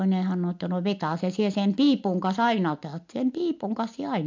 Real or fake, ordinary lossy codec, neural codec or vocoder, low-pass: real; MP3, 64 kbps; none; 7.2 kHz